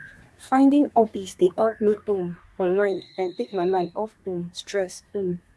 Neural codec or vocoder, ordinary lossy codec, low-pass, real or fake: codec, 24 kHz, 1 kbps, SNAC; none; none; fake